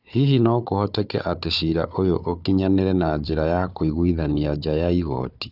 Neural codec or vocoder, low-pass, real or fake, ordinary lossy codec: codec, 16 kHz, 4 kbps, FunCodec, trained on Chinese and English, 50 frames a second; 5.4 kHz; fake; none